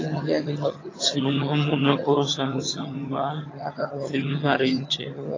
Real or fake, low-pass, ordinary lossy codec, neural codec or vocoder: fake; 7.2 kHz; AAC, 32 kbps; vocoder, 22.05 kHz, 80 mel bands, HiFi-GAN